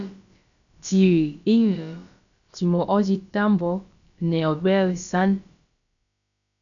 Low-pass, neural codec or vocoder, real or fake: 7.2 kHz; codec, 16 kHz, about 1 kbps, DyCAST, with the encoder's durations; fake